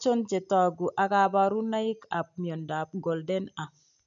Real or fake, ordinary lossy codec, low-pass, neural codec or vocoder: real; none; 7.2 kHz; none